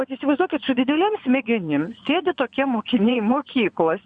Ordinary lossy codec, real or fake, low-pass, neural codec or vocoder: Opus, 64 kbps; fake; 9.9 kHz; vocoder, 24 kHz, 100 mel bands, Vocos